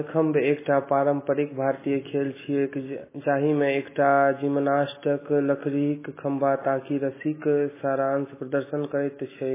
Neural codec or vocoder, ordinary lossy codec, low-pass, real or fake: none; MP3, 16 kbps; 3.6 kHz; real